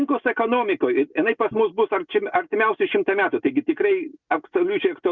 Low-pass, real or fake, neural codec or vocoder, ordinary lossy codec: 7.2 kHz; real; none; Opus, 64 kbps